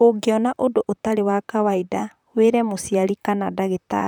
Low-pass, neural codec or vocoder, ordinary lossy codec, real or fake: 19.8 kHz; vocoder, 44.1 kHz, 128 mel bands, Pupu-Vocoder; none; fake